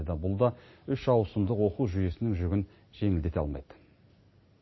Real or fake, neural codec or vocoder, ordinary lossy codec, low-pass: real; none; MP3, 24 kbps; 7.2 kHz